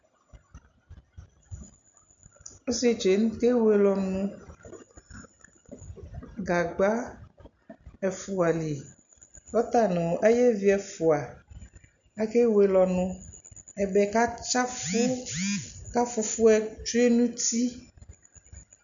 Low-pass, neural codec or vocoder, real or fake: 7.2 kHz; none; real